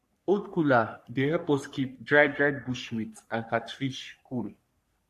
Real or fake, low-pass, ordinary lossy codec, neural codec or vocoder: fake; 14.4 kHz; MP3, 64 kbps; codec, 44.1 kHz, 3.4 kbps, Pupu-Codec